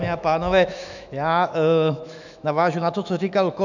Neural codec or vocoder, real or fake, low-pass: autoencoder, 48 kHz, 128 numbers a frame, DAC-VAE, trained on Japanese speech; fake; 7.2 kHz